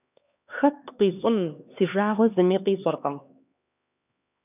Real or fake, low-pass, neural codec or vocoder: fake; 3.6 kHz; codec, 16 kHz, 2 kbps, X-Codec, HuBERT features, trained on LibriSpeech